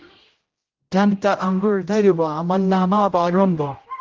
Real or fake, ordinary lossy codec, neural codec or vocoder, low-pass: fake; Opus, 16 kbps; codec, 16 kHz, 0.5 kbps, X-Codec, HuBERT features, trained on general audio; 7.2 kHz